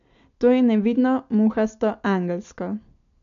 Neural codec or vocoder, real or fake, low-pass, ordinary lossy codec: none; real; 7.2 kHz; AAC, 96 kbps